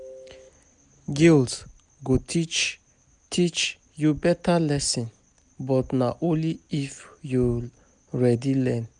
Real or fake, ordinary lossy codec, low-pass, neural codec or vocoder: real; none; 9.9 kHz; none